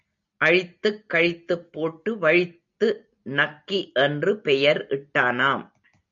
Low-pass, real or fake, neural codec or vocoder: 7.2 kHz; real; none